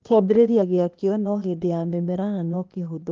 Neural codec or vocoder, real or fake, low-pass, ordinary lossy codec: codec, 16 kHz, 0.8 kbps, ZipCodec; fake; 7.2 kHz; Opus, 24 kbps